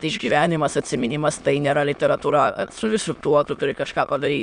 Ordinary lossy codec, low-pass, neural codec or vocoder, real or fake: Opus, 64 kbps; 9.9 kHz; autoencoder, 22.05 kHz, a latent of 192 numbers a frame, VITS, trained on many speakers; fake